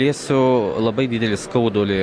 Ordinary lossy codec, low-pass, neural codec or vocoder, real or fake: MP3, 96 kbps; 9.9 kHz; none; real